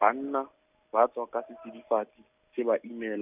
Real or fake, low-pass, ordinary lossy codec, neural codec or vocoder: real; 3.6 kHz; none; none